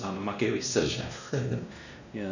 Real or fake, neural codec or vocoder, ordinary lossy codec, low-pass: fake; codec, 16 kHz, 1 kbps, X-Codec, WavLM features, trained on Multilingual LibriSpeech; none; 7.2 kHz